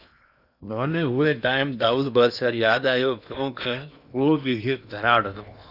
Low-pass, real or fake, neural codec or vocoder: 5.4 kHz; fake; codec, 16 kHz in and 24 kHz out, 0.8 kbps, FocalCodec, streaming, 65536 codes